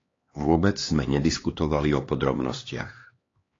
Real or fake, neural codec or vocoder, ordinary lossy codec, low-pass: fake; codec, 16 kHz, 2 kbps, X-Codec, HuBERT features, trained on LibriSpeech; AAC, 32 kbps; 7.2 kHz